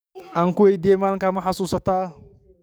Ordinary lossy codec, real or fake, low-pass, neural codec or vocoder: none; fake; none; codec, 44.1 kHz, 7.8 kbps, DAC